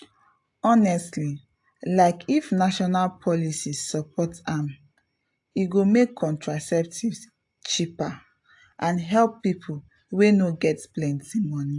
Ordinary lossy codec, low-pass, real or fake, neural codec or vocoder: AAC, 64 kbps; 10.8 kHz; real; none